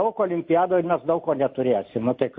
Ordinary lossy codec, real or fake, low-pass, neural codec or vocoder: MP3, 32 kbps; real; 7.2 kHz; none